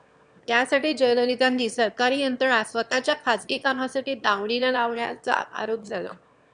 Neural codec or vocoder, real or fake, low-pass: autoencoder, 22.05 kHz, a latent of 192 numbers a frame, VITS, trained on one speaker; fake; 9.9 kHz